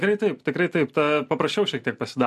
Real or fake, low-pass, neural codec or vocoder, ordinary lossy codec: real; 14.4 kHz; none; MP3, 64 kbps